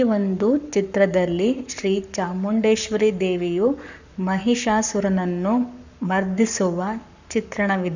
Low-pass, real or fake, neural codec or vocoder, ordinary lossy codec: 7.2 kHz; fake; codec, 44.1 kHz, 7.8 kbps, Pupu-Codec; none